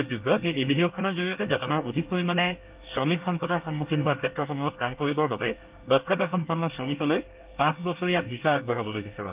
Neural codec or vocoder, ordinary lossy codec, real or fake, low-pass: codec, 24 kHz, 1 kbps, SNAC; Opus, 32 kbps; fake; 3.6 kHz